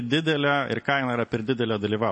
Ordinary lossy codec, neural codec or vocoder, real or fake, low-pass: MP3, 32 kbps; none; real; 10.8 kHz